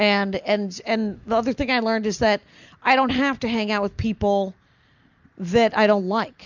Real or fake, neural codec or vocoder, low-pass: real; none; 7.2 kHz